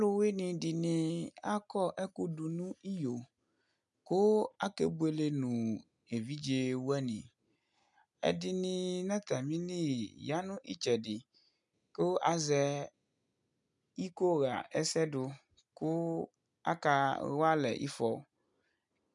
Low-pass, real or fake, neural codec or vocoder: 10.8 kHz; real; none